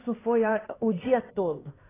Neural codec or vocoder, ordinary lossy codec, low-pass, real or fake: codec, 16 kHz, 4 kbps, X-Codec, HuBERT features, trained on LibriSpeech; AAC, 16 kbps; 3.6 kHz; fake